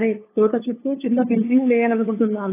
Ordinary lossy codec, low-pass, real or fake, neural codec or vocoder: AAC, 16 kbps; 3.6 kHz; fake; codec, 16 kHz, 2 kbps, FunCodec, trained on LibriTTS, 25 frames a second